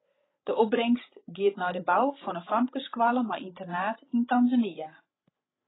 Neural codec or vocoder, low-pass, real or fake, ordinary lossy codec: codec, 16 kHz, 16 kbps, FreqCodec, larger model; 7.2 kHz; fake; AAC, 16 kbps